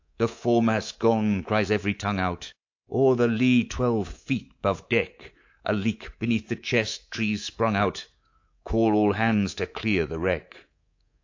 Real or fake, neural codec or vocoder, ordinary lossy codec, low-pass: fake; codec, 24 kHz, 3.1 kbps, DualCodec; AAC, 48 kbps; 7.2 kHz